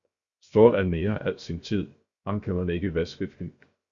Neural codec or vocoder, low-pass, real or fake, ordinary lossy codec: codec, 16 kHz, 0.7 kbps, FocalCodec; 7.2 kHz; fake; Opus, 64 kbps